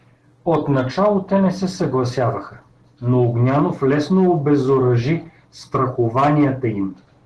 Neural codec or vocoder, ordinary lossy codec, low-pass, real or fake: none; Opus, 16 kbps; 10.8 kHz; real